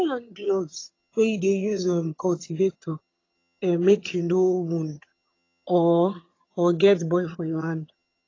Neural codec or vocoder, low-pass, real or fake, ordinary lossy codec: vocoder, 22.05 kHz, 80 mel bands, HiFi-GAN; 7.2 kHz; fake; AAC, 32 kbps